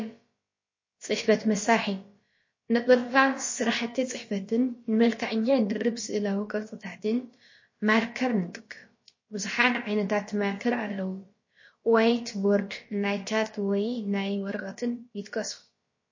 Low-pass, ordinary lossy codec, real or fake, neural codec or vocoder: 7.2 kHz; MP3, 32 kbps; fake; codec, 16 kHz, about 1 kbps, DyCAST, with the encoder's durations